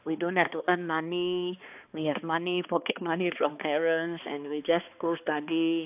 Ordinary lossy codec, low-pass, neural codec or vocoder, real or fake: AAC, 32 kbps; 3.6 kHz; codec, 16 kHz, 2 kbps, X-Codec, HuBERT features, trained on balanced general audio; fake